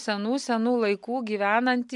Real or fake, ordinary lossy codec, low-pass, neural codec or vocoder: real; MP3, 64 kbps; 10.8 kHz; none